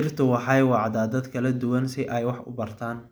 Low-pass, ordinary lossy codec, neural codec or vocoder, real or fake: none; none; none; real